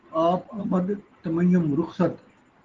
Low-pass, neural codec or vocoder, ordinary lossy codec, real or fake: 7.2 kHz; none; Opus, 16 kbps; real